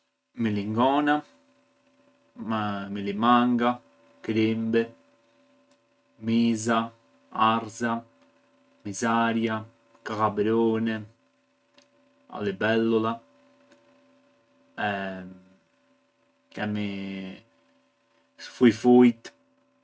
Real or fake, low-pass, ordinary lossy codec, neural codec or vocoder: real; none; none; none